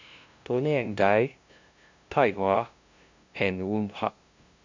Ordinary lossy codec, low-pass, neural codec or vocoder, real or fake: MP3, 64 kbps; 7.2 kHz; codec, 16 kHz, 0.5 kbps, FunCodec, trained on LibriTTS, 25 frames a second; fake